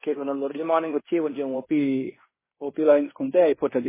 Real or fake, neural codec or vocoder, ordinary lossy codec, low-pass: fake; codec, 16 kHz in and 24 kHz out, 0.9 kbps, LongCat-Audio-Codec, fine tuned four codebook decoder; MP3, 16 kbps; 3.6 kHz